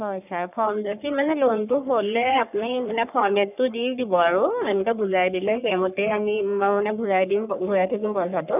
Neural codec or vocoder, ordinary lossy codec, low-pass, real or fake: codec, 44.1 kHz, 3.4 kbps, Pupu-Codec; none; 3.6 kHz; fake